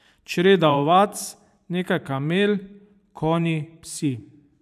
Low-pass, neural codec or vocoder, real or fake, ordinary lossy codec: 14.4 kHz; vocoder, 44.1 kHz, 128 mel bands every 512 samples, BigVGAN v2; fake; none